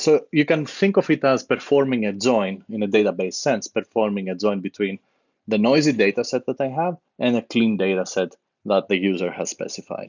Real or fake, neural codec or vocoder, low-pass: real; none; 7.2 kHz